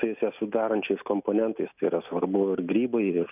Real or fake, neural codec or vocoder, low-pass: real; none; 3.6 kHz